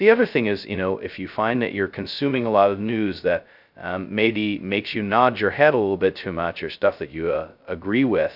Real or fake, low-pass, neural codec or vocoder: fake; 5.4 kHz; codec, 16 kHz, 0.2 kbps, FocalCodec